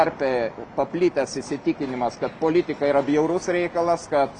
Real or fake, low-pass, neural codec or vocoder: real; 10.8 kHz; none